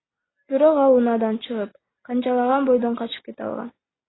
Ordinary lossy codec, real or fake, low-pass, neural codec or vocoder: AAC, 16 kbps; real; 7.2 kHz; none